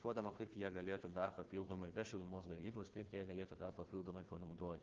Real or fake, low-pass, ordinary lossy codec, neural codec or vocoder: fake; 7.2 kHz; Opus, 16 kbps; codec, 16 kHz, 1 kbps, FunCodec, trained on Chinese and English, 50 frames a second